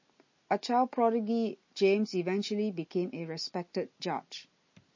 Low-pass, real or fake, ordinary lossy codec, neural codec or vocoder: 7.2 kHz; real; MP3, 32 kbps; none